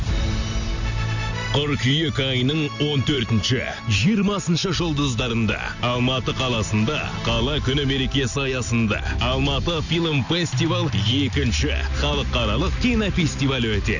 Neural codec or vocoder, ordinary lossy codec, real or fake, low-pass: none; none; real; 7.2 kHz